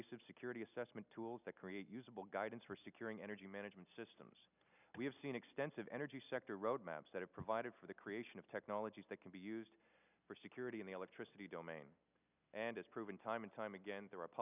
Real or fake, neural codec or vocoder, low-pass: real; none; 3.6 kHz